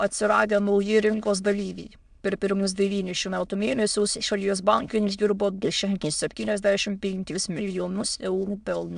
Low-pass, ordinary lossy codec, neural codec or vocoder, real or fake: 9.9 kHz; AAC, 96 kbps; autoencoder, 22.05 kHz, a latent of 192 numbers a frame, VITS, trained on many speakers; fake